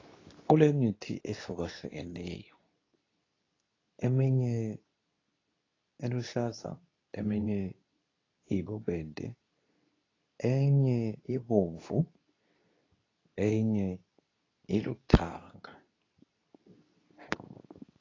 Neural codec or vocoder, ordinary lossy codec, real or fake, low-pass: codec, 24 kHz, 0.9 kbps, WavTokenizer, medium speech release version 2; AAC, 32 kbps; fake; 7.2 kHz